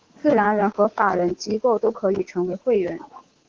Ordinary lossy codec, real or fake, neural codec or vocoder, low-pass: Opus, 16 kbps; fake; codec, 24 kHz, 3.1 kbps, DualCodec; 7.2 kHz